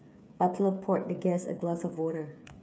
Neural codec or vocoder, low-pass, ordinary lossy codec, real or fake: codec, 16 kHz, 8 kbps, FreqCodec, smaller model; none; none; fake